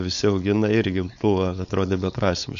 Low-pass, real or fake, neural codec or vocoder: 7.2 kHz; fake; codec, 16 kHz, 4.8 kbps, FACodec